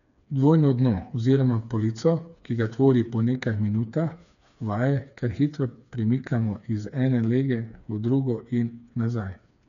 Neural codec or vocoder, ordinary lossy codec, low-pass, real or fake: codec, 16 kHz, 4 kbps, FreqCodec, smaller model; none; 7.2 kHz; fake